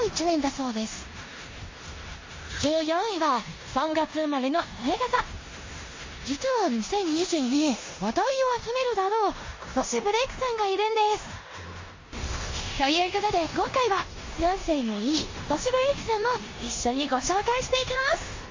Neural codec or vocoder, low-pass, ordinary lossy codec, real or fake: codec, 16 kHz in and 24 kHz out, 0.9 kbps, LongCat-Audio-Codec, four codebook decoder; 7.2 kHz; MP3, 32 kbps; fake